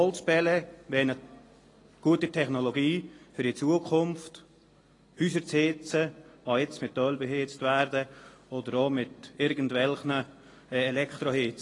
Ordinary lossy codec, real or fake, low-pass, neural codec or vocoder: AAC, 32 kbps; real; 10.8 kHz; none